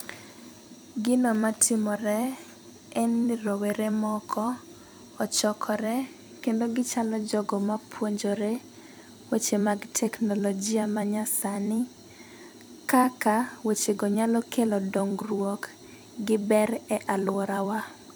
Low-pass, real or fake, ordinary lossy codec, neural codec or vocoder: none; fake; none; vocoder, 44.1 kHz, 128 mel bands every 256 samples, BigVGAN v2